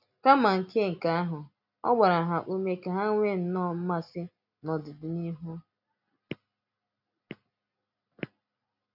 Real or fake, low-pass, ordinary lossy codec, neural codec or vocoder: real; 5.4 kHz; none; none